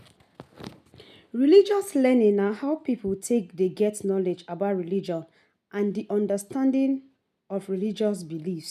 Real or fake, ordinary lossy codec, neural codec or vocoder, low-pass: real; none; none; 14.4 kHz